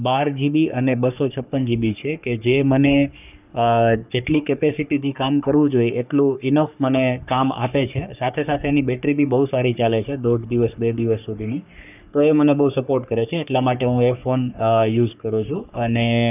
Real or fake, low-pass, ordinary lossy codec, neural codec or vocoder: fake; 3.6 kHz; none; codec, 44.1 kHz, 3.4 kbps, Pupu-Codec